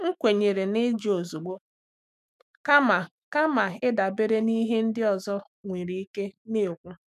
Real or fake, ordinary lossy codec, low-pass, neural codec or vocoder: fake; none; 14.4 kHz; autoencoder, 48 kHz, 128 numbers a frame, DAC-VAE, trained on Japanese speech